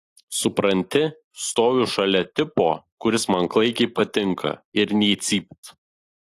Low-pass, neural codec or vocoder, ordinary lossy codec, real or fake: 14.4 kHz; none; AAC, 48 kbps; real